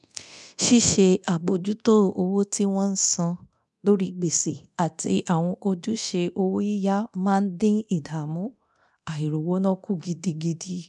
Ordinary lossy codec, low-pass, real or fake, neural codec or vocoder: none; 10.8 kHz; fake; codec, 24 kHz, 0.9 kbps, DualCodec